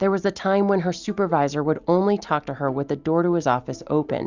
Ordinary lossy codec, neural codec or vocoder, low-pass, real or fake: Opus, 64 kbps; none; 7.2 kHz; real